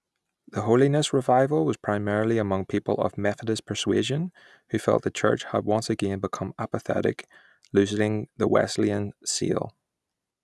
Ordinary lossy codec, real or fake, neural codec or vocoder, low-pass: none; real; none; none